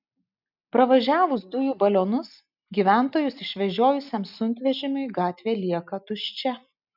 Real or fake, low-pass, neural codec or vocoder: real; 5.4 kHz; none